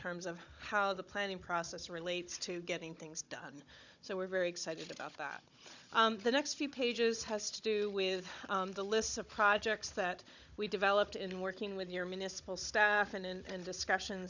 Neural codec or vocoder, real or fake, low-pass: codec, 16 kHz, 16 kbps, FunCodec, trained on Chinese and English, 50 frames a second; fake; 7.2 kHz